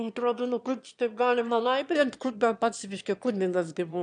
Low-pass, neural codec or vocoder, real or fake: 9.9 kHz; autoencoder, 22.05 kHz, a latent of 192 numbers a frame, VITS, trained on one speaker; fake